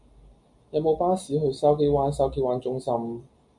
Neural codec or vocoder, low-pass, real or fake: none; 10.8 kHz; real